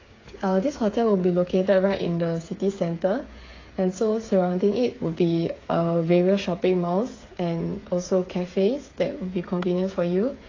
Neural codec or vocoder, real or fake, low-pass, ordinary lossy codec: codec, 16 kHz, 8 kbps, FreqCodec, smaller model; fake; 7.2 kHz; AAC, 32 kbps